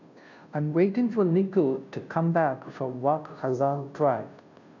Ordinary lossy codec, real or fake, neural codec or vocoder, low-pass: none; fake; codec, 16 kHz, 0.5 kbps, FunCodec, trained on Chinese and English, 25 frames a second; 7.2 kHz